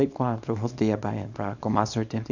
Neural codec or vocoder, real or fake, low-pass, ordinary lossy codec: codec, 24 kHz, 0.9 kbps, WavTokenizer, small release; fake; 7.2 kHz; none